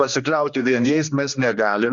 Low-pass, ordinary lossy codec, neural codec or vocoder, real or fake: 7.2 kHz; Opus, 64 kbps; codec, 16 kHz, 2 kbps, X-Codec, HuBERT features, trained on general audio; fake